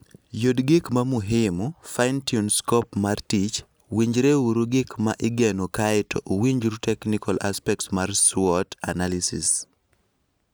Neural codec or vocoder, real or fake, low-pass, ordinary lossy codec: none; real; none; none